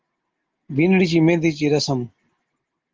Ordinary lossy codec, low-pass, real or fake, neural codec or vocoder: Opus, 24 kbps; 7.2 kHz; real; none